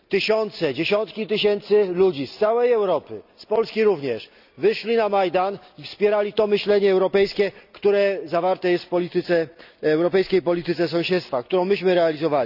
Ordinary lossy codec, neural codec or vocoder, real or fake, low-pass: none; none; real; 5.4 kHz